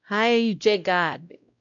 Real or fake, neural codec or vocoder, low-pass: fake; codec, 16 kHz, 0.5 kbps, X-Codec, WavLM features, trained on Multilingual LibriSpeech; 7.2 kHz